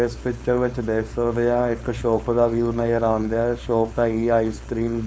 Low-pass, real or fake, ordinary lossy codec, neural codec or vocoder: none; fake; none; codec, 16 kHz, 4.8 kbps, FACodec